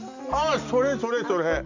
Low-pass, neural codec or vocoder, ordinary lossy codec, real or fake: 7.2 kHz; none; none; real